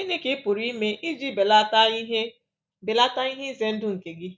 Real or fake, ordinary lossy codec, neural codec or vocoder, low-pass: real; none; none; none